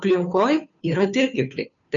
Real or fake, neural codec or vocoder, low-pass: fake; codec, 16 kHz, 2 kbps, FunCodec, trained on Chinese and English, 25 frames a second; 7.2 kHz